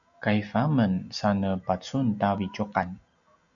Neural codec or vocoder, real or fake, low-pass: none; real; 7.2 kHz